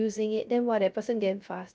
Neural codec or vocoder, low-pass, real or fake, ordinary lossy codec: codec, 16 kHz, 0.3 kbps, FocalCodec; none; fake; none